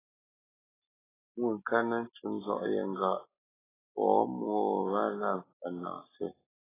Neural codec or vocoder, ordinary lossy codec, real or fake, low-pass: none; AAC, 16 kbps; real; 3.6 kHz